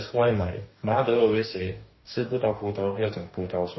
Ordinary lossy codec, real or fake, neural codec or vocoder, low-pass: MP3, 24 kbps; fake; codec, 44.1 kHz, 2.6 kbps, DAC; 7.2 kHz